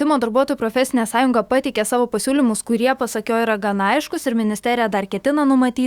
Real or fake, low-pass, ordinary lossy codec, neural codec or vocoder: fake; 19.8 kHz; Opus, 64 kbps; autoencoder, 48 kHz, 128 numbers a frame, DAC-VAE, trained on Japanese speech